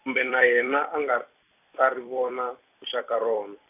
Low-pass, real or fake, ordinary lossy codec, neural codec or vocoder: 3.6 kHz; fake; none; vocoder, 44.1 kHz, 128 mel bands every 256 samples, BigVGAN v2